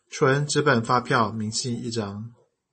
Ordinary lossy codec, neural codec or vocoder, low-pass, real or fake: MP3, 32 kbps; none; 10.8 kHz; real